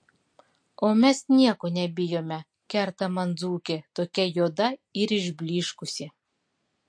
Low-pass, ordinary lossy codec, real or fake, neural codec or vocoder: 9.9 kHz; MP3, 48 kbps; real; none